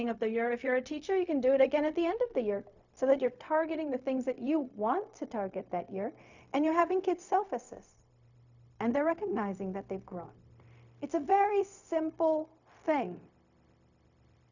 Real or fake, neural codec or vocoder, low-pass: fake; codec, 16 kHz, 0.4 kbps, LongCat-Audio-Codec; 7.2 kHz